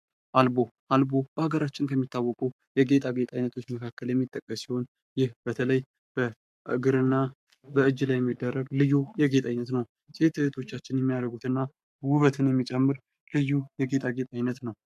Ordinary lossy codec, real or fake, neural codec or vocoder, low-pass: MP3, 96 kbps; fake; codec, 44.1 kHz, 7.8 kbps, Pupu-Codec; 14.4 kHz